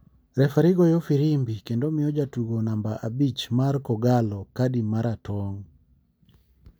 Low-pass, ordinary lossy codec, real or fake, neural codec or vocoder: none; none; real; none